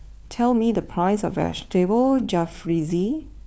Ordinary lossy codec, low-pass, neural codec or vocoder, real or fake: none; none; codec, 16 kHz, 4 kbps, FunCodec, trained on LibriTTS, 50 frames a second; fake